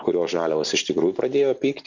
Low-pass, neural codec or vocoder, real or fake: 7.2 kHz; none; real